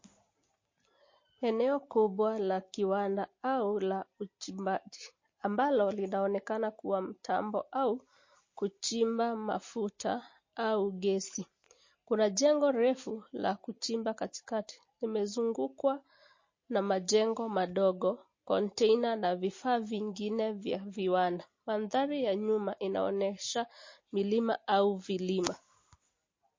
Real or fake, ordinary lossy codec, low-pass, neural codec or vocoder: real; MP3, 32 kbps; 7.2 kHz; none